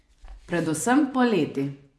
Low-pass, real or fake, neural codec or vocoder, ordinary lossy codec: none; fake; vocoder, 24 kHz, 100 mel bands, Vocos; none